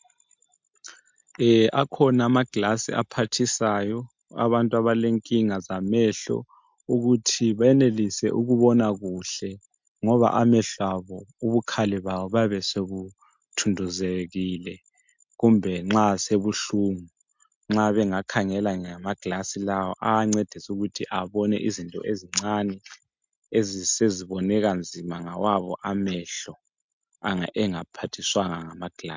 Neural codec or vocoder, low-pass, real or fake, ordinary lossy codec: none; 7.2 kHz; real; MP3, 64 kbps